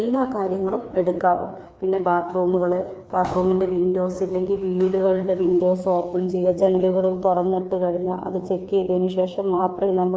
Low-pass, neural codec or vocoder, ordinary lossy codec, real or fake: none; codec, 16 kHz, 2 kbps, FreqCodec, larger model; none; fake